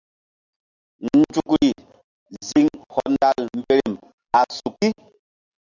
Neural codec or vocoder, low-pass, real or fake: none; 7.2 kHz; real